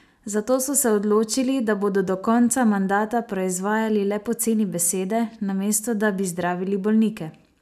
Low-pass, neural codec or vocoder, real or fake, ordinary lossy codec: 14.4 kHz; none; real; none